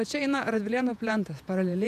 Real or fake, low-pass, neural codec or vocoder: fake; 14.4 kHz; vocoder, 48 kHz, 128 mel bands, Vocos